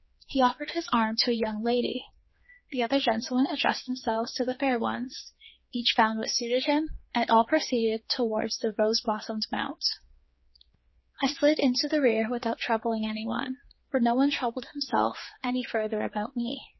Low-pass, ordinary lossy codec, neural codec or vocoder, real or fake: 7.2 kHz; MP3, 24 kbps; codec, 16 kHz, 4 kbps, X-Codec, HuBERT features, trained on general audio; fake